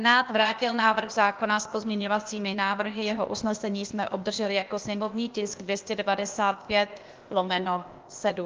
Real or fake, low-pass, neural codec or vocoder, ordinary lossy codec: fake; 7.2 kHz; codec, 16 kHz, 0.8 kbps, ZipCodec; Opus, 24 kbps